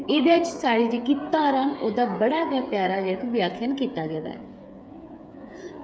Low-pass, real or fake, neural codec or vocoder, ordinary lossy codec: none; fake; codec, 16 kHz, 8 kbps, FreqCodec, smaller model; none